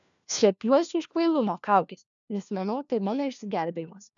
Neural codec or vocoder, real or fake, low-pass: codec, 16 kHz, 1 kbps, FunCodec, trained on LibriTTS, 50 frames a second; fake; 7.2 kHz